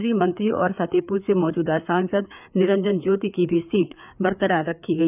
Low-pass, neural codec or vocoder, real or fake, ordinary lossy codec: 3.6 kHz; codec, 16 kHz, 4 kbps, FreqCodec, larger model; fake; none